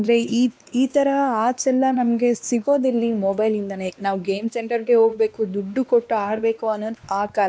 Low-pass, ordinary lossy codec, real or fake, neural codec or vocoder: none; none; fake; codec, 16 kHz, 2 kbps, X-Codec, WavLM features, trained on Multilingual LibriSpeech